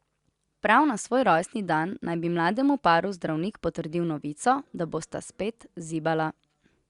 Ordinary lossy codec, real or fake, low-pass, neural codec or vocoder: Opus, 64 kbps; real; 10.8 kHz; none